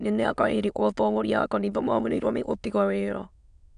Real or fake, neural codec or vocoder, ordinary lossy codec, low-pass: fake; autoencoder, 22.05 kHz, a latent of 192 numbers a frame, VITS, trained on many speakers; none; 9.9 kHz